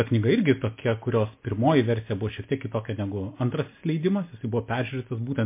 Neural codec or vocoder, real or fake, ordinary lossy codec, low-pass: none; real; MP3, 24 kbps; 3.6 kHz